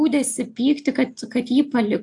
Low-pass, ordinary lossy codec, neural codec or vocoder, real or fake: 10.8 kHz; AAC, 64 kbps; none; real